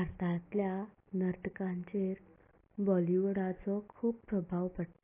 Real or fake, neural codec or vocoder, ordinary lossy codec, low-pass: real; none; AAC, 24 kbps; 3.6 kHz